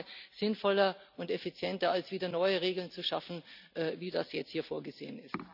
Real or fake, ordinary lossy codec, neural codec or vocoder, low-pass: real; none; none; 5.4 kHz